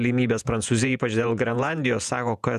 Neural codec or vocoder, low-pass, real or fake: vocoder, 48 kHz, 128 mel bands, Vocos; 14.4 kHz; fake